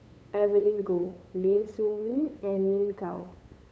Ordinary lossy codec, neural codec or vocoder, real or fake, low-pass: none; codec, 16 kHz, 8 kbps, FunCodec, trained on LibriTTS, 25 frames a second; fake; none